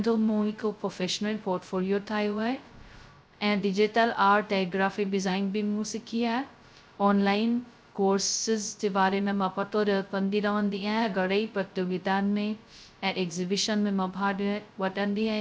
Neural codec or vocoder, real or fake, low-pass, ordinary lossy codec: codec, 16 kHz, 0.2 kbps, FocalCodec; fake; none; none